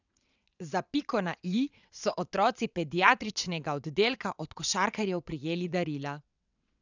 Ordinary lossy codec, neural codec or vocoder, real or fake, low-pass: none; vocoder, 22.05 kHz, 80 mel bands, WaveNeXt; fake; 7.2 kHz